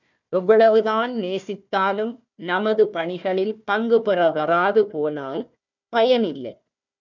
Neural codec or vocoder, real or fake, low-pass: codec, 16 kHz, 1 kbps, FunCodec, trained on Chinese and English, 50 frames a second; fake; 7.2 kHz